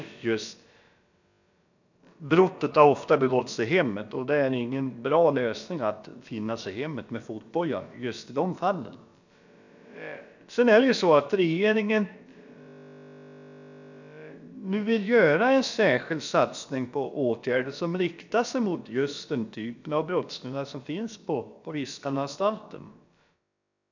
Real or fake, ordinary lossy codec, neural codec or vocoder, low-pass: fake; none; codec, 16 kHz, about 1 kbps, DyCAST, with the encoder's durations; 7.2 kHz